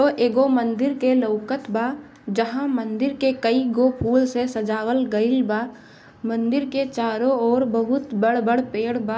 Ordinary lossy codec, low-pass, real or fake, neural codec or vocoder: none; none; real; none